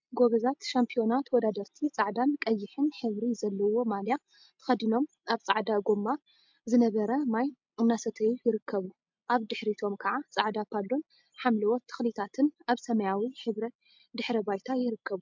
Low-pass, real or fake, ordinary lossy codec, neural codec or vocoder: 7.2 kHz; real; MP3, 64 kbps; none